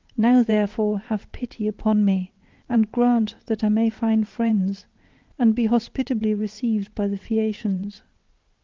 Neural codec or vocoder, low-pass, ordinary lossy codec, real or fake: vocoder, 22.05 kHz, 80 mel bands, WaveNeXt; 7.2 kHz; Opus, 32 kbps; fake